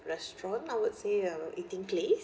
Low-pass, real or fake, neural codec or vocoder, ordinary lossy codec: none; real; none; none